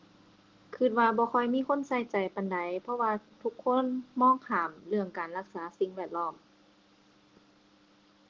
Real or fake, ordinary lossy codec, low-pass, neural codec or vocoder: real; Opus, 16 kbps; 7.2 kHz; none